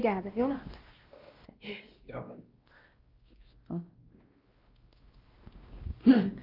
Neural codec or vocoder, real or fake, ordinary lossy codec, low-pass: codec, 16 kHz, 1 kbps, X-Codec, WavLM features, trained on Multilingual LibriSpeech; fake; Opus, 32 kbps; 5.4 kHz